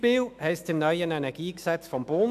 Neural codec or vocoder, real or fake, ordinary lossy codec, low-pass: autoencoder, 48 kHz, 128 numbers a frame, DAC-VAE, trained on Japanese speech; fake; none; 14.4 kHz